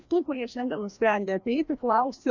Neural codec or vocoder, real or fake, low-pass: codec, 16 kHz, 1 kbps, FreqCodec, larger model; fake; 7.2 kHz